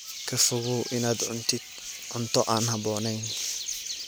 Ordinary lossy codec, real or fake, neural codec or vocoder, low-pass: none; real; none; none